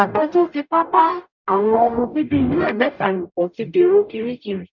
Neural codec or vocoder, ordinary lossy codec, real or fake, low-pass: codec, 44.1 kHz, 0.9 kbps, DAC; none; fake; 7.2 kHz